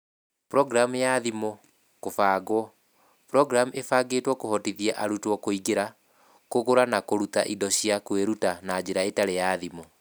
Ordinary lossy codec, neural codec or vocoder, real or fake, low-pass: none; none; real; none